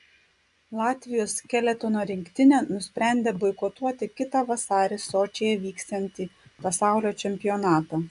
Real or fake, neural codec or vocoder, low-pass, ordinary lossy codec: fake; vocoder, 24 kHz, 100 mel bands, Vocos; 10.8 kHz; MP3, 96 kbps